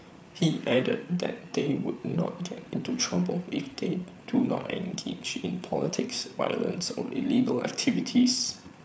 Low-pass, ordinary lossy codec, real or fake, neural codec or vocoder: none; none; fake; codec, 16 kHz, 8 kbps, FreqCodec, larger model